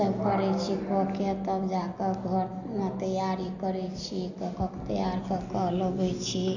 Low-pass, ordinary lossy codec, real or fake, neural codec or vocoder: 7.2 kHz; none; real; none